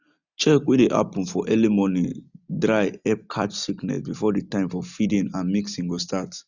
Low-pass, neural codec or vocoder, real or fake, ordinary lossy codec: 7.2 kHz; none; real; none